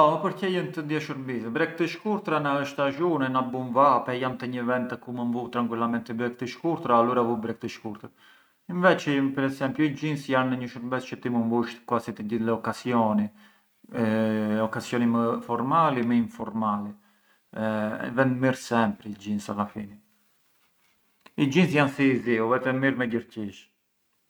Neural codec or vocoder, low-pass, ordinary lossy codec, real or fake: none; none; none; real